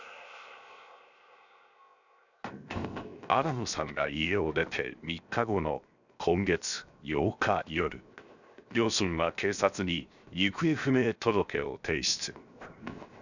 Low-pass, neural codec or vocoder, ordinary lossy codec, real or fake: 7.2 kHz; codec, 16 kHz, 0.7 kbps, FocalCodec; none; fake